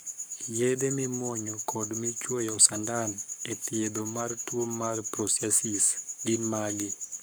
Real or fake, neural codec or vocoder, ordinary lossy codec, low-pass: fake; codec, 44.1 kHz, 7.8 kbps, Pupu-Codec; none; none